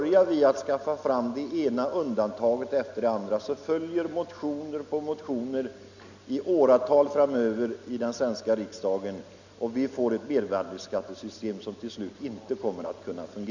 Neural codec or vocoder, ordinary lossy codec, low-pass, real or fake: none; none; 7.2 kHz; real